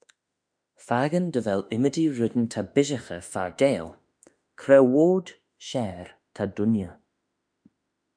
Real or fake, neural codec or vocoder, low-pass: fake; autoencoder, 48 kHz, 32 numbers a frame, DAC-VAE, trained on Japanese speech; 9.9 kHz